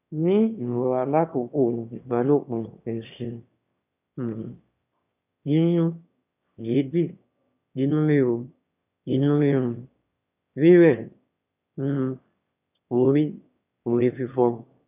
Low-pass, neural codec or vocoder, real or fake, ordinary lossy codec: 3.6 kHz; autoencoder, 22.05 kHz, a latent of 192 numbers a frame, VITS, trained on one speaker; fake; none